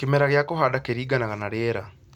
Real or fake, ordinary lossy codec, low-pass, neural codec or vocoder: real; none; 19.8 kHz; none